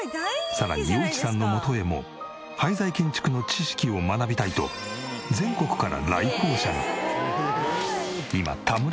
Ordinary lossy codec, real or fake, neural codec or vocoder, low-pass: none; real; none; none